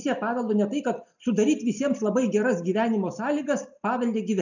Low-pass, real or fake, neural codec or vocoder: 7.2 kHz; real; none